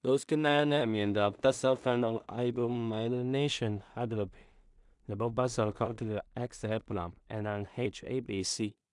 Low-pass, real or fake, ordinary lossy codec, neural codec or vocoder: 10.8 kHz; fake; MP3, 96 kbps; codec, 16 kHz in and 24 kHz out, 0.4 kbps, LongCat-Audio-Codec, two codebook decoder